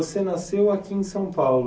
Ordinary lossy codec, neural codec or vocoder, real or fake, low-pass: none; none; real; none